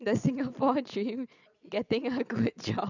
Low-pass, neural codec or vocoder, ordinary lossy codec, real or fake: 7.2 kHz; vocoder, 22.05 kHz, 80 mel bands, Vocos; none; fake